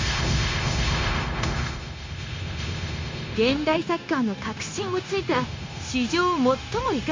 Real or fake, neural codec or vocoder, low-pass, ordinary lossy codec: fake; codec, 16 kHz, 0.9 kbps, LongCat-Audio-Codec; 7.2 kHz; MP3, 48 kbps